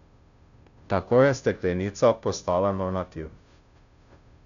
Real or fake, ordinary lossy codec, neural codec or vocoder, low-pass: fake; MP3, 96 kbps; codec, 16 kHz, 0.5 kbps, FunCodec, trained on Chinese and English, 25 frames a second; 7.2 kHz